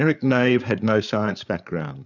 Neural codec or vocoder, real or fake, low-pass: codec, 16 kHz, 8 kbps, FreqCodec, larger model; fake; 7.2 kHz